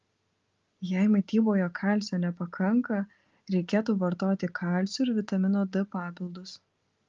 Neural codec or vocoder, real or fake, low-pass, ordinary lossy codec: none; real; 7.2 kHz; Opus, 32 kbps